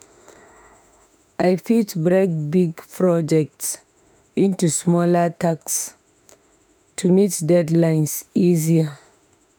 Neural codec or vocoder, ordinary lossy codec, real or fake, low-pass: autoencoder, 48 kHz, 32 numbers a frame, DAC-VAE, trained on Japanese speech; none; fake; none